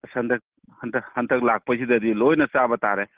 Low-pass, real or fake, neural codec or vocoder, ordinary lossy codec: 3.6 kHz; real; none; Opus, 16 kbps